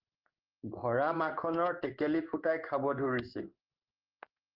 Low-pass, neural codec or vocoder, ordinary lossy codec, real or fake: 5.4 kHz; codec, 16 kHz in and 24 kHz out, 1 kbps, XY-Tokenizer; Opus, 32 kbps; fake